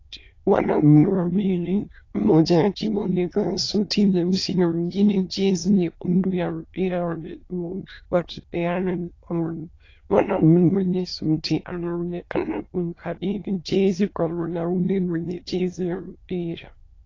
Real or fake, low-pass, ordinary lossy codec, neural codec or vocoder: fake; 7.2 kHz; AAC, 32 kbps; autoencoder, 22.05 kHz, a latent of 192 numbers a frame, VITS, trained on many speakers